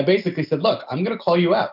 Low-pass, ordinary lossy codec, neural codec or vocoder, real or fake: 5.4 kHz; AAC, 48 kbps; none; real